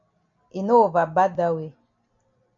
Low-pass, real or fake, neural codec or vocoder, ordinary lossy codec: 7.2 kHz; real; none; MP3, 48 kbps